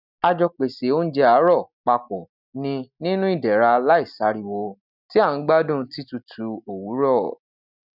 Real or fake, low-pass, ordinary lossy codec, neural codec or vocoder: real; 5.4 kHz; none; none